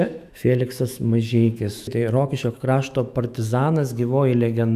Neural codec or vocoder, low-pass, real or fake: autoencoder, 48 kHz, 128 numbers a frame, DAC-VAE, trained on Japanese speech; 14.4 kHz; fake